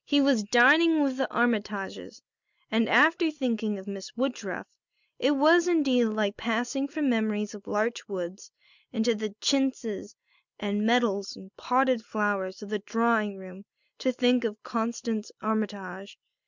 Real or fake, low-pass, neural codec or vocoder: real; 7.2 kHz; none